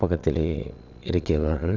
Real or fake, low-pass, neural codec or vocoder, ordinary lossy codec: fake; 7.2 kHz; vocoder, 22.05 kHz, 80 mel bands, Vocos; none